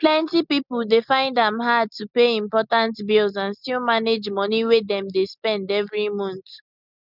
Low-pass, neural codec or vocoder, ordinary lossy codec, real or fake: 5.4 kHz; none; none; real